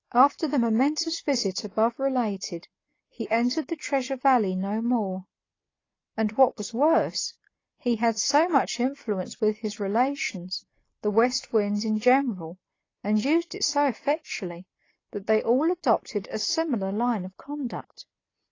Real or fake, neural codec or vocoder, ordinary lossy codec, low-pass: real; none; AAC, 32 kbps; 7.2 kHz